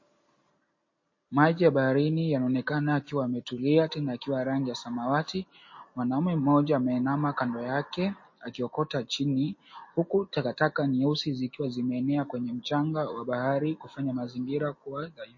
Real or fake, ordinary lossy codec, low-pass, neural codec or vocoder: real; MP3, 32 kbps; 7.2 kHz; none